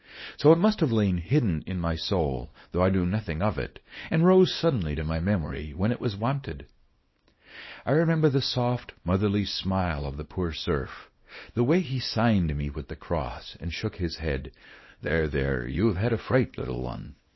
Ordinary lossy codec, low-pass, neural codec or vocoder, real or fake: MP3, 24 kbps; 7.2 kHz; codec, 24 kHz, 0.9 kbps, WavTokenizer, small release; fake